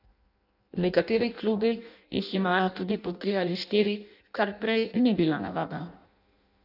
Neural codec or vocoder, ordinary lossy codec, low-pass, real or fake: codec, 16 kHz in and 24 kHz out, 0.6 kbps, FireRedTTS-2 codec; none; 5.4 kHz; fake